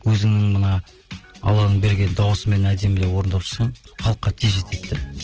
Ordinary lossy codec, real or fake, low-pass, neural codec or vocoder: Opus, 16 kbps; real; 7.2 kHz; none